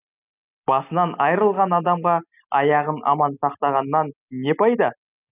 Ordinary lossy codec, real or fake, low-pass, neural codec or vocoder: none; real; 3.6 kHz; none